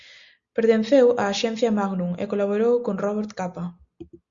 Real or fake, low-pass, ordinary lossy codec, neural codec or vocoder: real; 7.2 kHz; Opus, 64 kbps; none